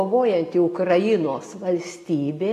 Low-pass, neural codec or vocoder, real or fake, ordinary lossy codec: 14.4 kHz; none; real; AAC, 48 kbps